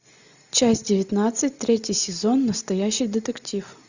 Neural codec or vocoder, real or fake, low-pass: none; real; 7.2 kHz